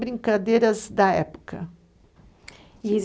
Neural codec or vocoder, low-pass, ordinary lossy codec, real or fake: none; none; none; real